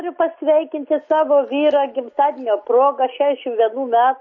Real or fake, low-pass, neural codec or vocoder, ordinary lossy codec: real; 7.2 kHz; none; MP3, 32 kbps